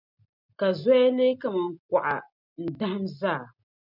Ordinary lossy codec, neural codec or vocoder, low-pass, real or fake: MP3, 48 kbps; none; 5.4 kHz; real